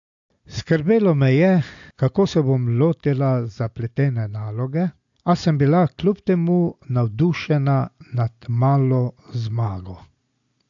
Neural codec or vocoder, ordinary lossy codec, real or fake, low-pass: none; AAC, 64 kbps; real; 7.2 kHz